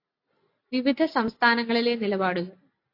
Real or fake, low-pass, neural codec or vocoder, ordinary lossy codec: real; 5.4 kHz; none; AAC, 32 kbps